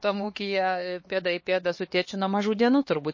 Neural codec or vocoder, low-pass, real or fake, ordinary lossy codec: codec, 16 kHz, 2 kbps, X-Codec, HuBERT features, trained on LibriSpeech; 7.2 kHz; fake; MP3, 32 kbps